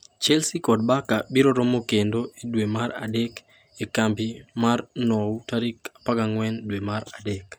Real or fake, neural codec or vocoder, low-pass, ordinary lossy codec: real; none; none; none